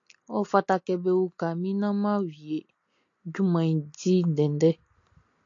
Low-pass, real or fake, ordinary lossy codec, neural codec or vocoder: 7.2 kHz; real; AAC, 48 kbps; none